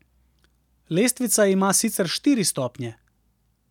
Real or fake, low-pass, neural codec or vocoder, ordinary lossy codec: real; 19.8 kHz; none; none